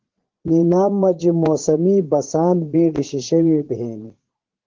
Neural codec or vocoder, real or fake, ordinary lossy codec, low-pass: vocoder, 44.1 kHz, 80 mel bands, Vocos; fake; Opus, 16 kbps; 7.2 kHz